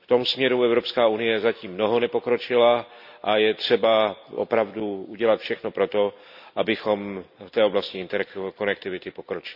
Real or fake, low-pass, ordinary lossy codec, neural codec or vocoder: real; 5.4 kHz; none; none